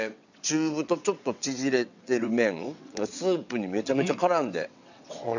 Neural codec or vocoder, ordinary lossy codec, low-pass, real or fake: vocoder, 22.05 kHz, 80 mel bands, WaveNeXt; none; 7.2 kHz; fake